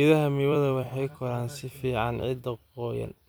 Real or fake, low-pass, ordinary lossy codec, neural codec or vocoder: fake; none; none; vocoder, 44.1 kHz, 128 mel bands every 256 samples, BigVGAN v2